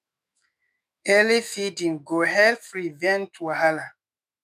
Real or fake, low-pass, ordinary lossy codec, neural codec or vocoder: fake; 14.4 kHz; none; autoencoder, 48 kHz, 128 numbers a frame, DAC-VAE, trained on Japanese speech